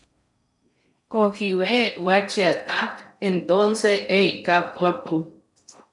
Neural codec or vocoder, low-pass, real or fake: codec, 16 kHz in and 24 kHz out, 0.6 kbps, FocalCodec, streaming, 4096 codes; 10.8 kHz; fake